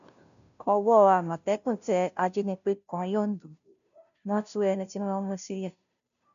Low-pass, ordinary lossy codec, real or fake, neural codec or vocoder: 7.2 kHz; none; fake; codec, 16 kHz, 0.5 kbps, FunCodec, trained on Chinese and English, 25 frames a second